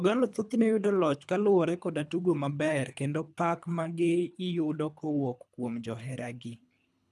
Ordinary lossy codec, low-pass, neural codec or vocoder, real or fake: none; none; codec, 24 kHz, 3 kbps, HILCodec; fake